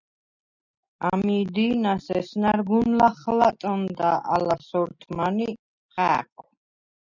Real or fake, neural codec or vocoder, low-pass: real; none; 7.2 kHz